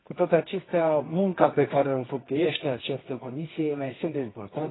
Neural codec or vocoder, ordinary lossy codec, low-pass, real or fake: codec, 24 kHz, 0.9 kbps, WavTokenizer, medium music audio release; AAC, 16 kbps; 7.2 kHz; fake